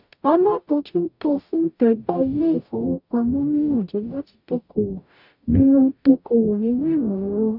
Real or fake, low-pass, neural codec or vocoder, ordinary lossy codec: fake; 5.4 kHz; codec, 44.1 kHz, 0.9 kbps, DAC; none